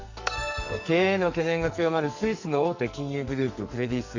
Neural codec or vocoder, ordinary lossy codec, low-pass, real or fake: codec, 44.1 kHz, 2.6 kbps, SNAC; Opus, 64 kbps; 7.2 kHz; fake